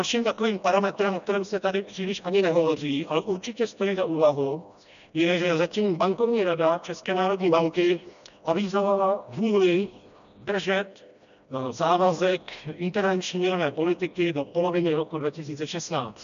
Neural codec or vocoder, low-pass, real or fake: codec, 16 kHz, 1 kbps, FreqCodec, smaller model; 7.2 kHz; fake